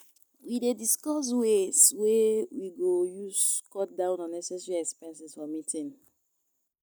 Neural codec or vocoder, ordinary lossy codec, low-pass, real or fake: none; none; none; real